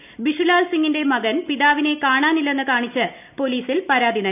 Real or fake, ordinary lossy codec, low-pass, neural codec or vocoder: real; none; 3.6 kHz; none